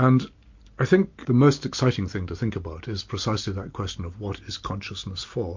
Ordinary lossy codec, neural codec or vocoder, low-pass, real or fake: MP3, 48 kbps; none; 7.2 kHz; real